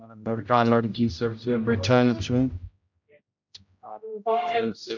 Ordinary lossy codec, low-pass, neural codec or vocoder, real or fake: MP3, 64 kbps; 7.2 kHz; codec, 16 kHz, 0.5 kbps, X-Codec, HuBERT features, trained on general audio; fake